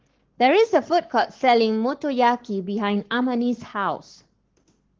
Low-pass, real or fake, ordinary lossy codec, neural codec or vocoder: 7.2 kHz; fake; Opus, 16 kbps; codec, 44.1 kHz, 7.8 kbps, Pupu-Codec